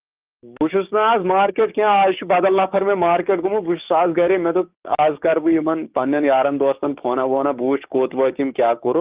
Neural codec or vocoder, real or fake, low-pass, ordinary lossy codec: codec, 16 kHz, 6 kbps, DAC; fake; 3.6 kHz; Opus, 32 kbps